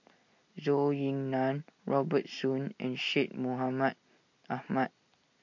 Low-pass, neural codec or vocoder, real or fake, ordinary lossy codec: 7.2 kHz; none; real; MP3, 48 kbps